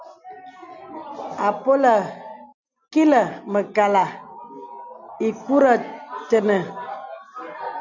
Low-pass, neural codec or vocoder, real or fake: 7.2 kHz; none; real